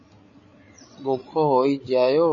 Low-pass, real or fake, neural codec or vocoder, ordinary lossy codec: 7.2 kHz; fake; codec, 16 kHz, 8 kbps, FreqCodec, larger model; MP3, 32 kbps